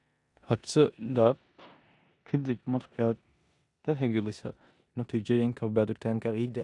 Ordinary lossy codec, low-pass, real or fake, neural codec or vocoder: none; 10.8 kHz; fake; codec, 16 kHz in and 24 kHz out, 0.9 kbps, LongCat-Audio-Codec, four codebook decoder